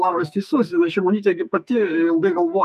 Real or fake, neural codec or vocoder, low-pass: fake; codec, 44.1 kHz, 2.6 kbps, SNAC; 14.4 kHz